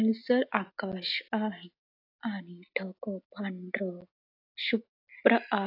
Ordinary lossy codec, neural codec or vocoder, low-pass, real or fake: AAC, 32 kbps; none; 5.4 kHz; real